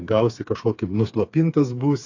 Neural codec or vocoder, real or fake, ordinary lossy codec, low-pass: codec, 16 kHz, 4 kbps, FreqCodec, smaller model; fake; AAC, 48 kbps; 7.2 kHz